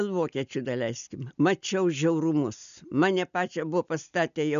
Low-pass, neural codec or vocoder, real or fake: 7.2 kHz; none; real